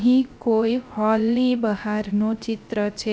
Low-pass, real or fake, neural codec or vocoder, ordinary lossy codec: none; fake; codec, 16 kHz, 0.3 kbps, FocalCodec; none